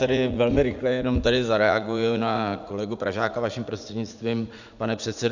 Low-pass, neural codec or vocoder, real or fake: 7.2 kHz; vocoder, 44.1 kHz, 80 mel bands, Vocos; fake